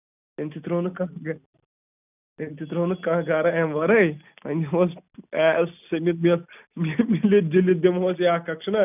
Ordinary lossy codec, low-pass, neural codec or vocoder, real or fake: none; 3.6 kHz; none; real